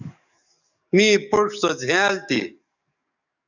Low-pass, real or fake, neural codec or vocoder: 7.2 kHz; fake; codec, 44.1 kHz, 7.8 kbps, DAC